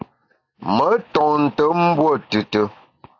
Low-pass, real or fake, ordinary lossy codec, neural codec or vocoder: 7.2 kHz; real; AAC, 48 kbps; none